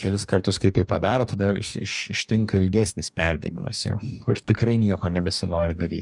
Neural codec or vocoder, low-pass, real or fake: codec, 44.1 kHz, 2.6 kbps, DAC; 10.8 kHz; fake